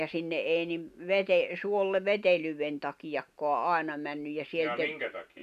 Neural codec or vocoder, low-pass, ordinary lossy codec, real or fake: none; 14.4 kHz; none; real